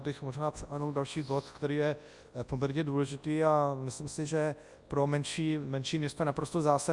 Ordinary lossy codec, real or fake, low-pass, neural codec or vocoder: Opus, 64 kbps; fake; 10.8 kHz; codec, 24 kHz, 0.9 kbps, WavTokenizer, large speech release